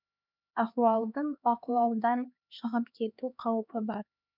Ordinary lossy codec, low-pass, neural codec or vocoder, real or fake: none; 5.4 kHz; codec, 16 kHz, 2 kbps, X-Codec, HuBERT features, trained on LibriSpeech; fake